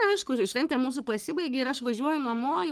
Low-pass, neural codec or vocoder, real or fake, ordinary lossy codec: 14.4 kHz; codec, 44.1 kHz, 3.4 kbps, Pupu-Codec; fake; Opus, 32 kbps